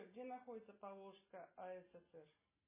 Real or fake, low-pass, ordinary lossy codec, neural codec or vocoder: real; 3.6 kHz; MP3, 16 kbps; none